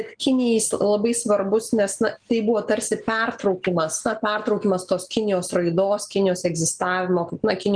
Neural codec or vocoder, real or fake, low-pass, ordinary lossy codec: none; real; 9.9 kHz; Opus, 24 kbps